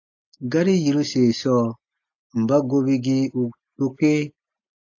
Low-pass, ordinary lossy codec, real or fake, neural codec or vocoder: 7.2 kHz; AAC, 48 kbps; real; none